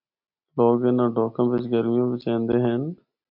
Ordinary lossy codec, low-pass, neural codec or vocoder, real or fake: MP3, 48 kbps; 5.4 kHz; none; real